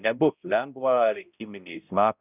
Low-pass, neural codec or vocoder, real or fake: 3.6 kHz; codec, 16 kHz, 0.5 kbps, X-Codec, HuBERT features, trained on balanced general audio; fake